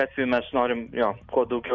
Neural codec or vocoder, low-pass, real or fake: none; 7.2 kHz; real